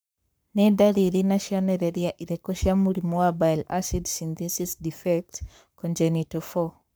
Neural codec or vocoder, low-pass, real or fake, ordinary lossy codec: codec, 44.1 kHz, 7.8 kbps, Pupu-Codec; none; fake; none